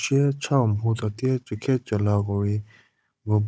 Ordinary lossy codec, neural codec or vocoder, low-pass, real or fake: none; codec, 16 kHz, 16 kbps, FunCodec, trained on Chinese and English, 50 frames a second; none; fake